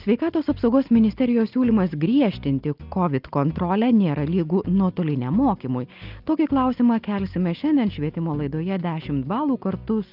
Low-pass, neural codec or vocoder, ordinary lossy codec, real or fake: 5.4 kHz; none; Opus, 24 kbps; real